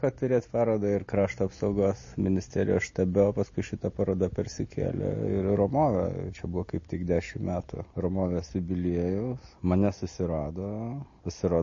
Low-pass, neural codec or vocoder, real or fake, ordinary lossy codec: 7.2 kHz; none; real; MP3, 32 kbps